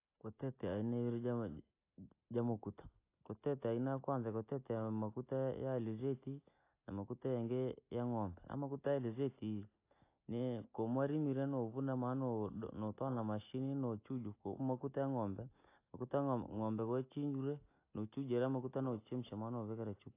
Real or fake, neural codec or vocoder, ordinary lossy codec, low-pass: real; none; AAC, 24 kbps; 3.6 kHz